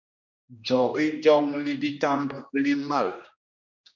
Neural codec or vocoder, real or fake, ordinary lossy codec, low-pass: codec, 16 kHz, 1 kbps, X-Codec, HuBERT features, trained on general audio; fake; MP3, 48 kbps; 7.2 kHz